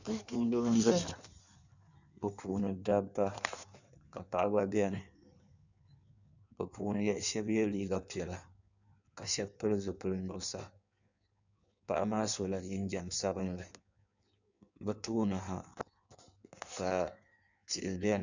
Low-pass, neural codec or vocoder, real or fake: 7.2 kHz; codec, 16 kHz in and 24 kHz out, 1.1 kbps, FireRedTTS-2 codec; fake